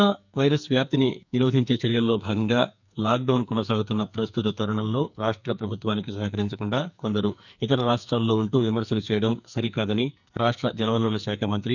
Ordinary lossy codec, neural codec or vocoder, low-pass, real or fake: none; codec, 44.1 kHz, 2.6 kbps, SNAC; 7.2 kHz; fake